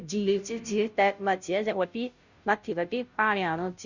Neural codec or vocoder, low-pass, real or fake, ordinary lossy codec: codec, 16 kHz, 0.5 kbps, FunCodec, trained on Chinese and English, 25 frames a second; 7.2 kHz; fake; none